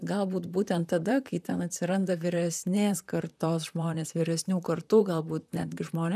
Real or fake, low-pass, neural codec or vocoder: fake; 14.4 kHz; vocoder, 44.1 kHz, 128 mel bands, Pupu-Vocoder